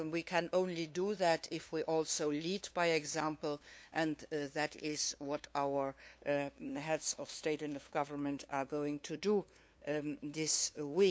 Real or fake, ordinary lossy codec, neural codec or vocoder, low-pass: fake; none; codec, 16 kHz, 2 kbps, FunCodec, trained on LibriTTS, 25 frames a second; none